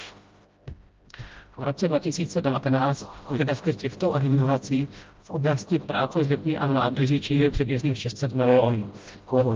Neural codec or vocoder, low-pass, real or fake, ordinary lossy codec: codec, 16 kHz, 0.5 kbps, FreqCodec, smaller model; 7.2 kHz; fake; Opus, 24 kbps